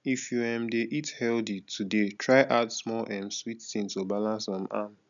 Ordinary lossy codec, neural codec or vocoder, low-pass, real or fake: none; none; 7.2 kHz; real